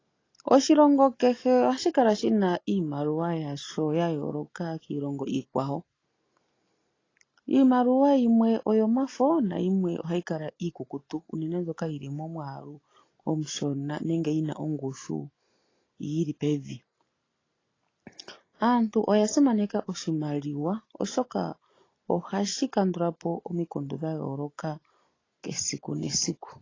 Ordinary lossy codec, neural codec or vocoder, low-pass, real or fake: AAC, 32 kbps; none; 7.2 kHz; real